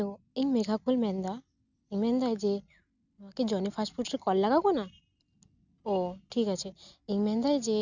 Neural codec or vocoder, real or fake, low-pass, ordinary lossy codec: none; real; 7.2 kHz; none